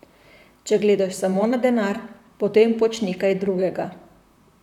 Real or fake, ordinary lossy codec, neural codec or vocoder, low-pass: fake; none; vocoder, 44.1 kHz, 128 mel bands, Pupu-Vocoder; 19.8 kHz